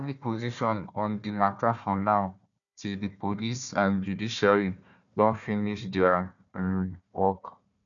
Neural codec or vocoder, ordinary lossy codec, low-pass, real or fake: codec, 16 kHz, 1 kbps, FunCodec, trained on Chinese and English, 50 frames a second; none; 7.2 kHz; fake